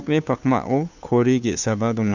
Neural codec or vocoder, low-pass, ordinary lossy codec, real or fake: codec, 16 kHz, 2 kbps, FunCodec, trained on Chinese and English, 25 frames a second; 7.2 kHz; none; fake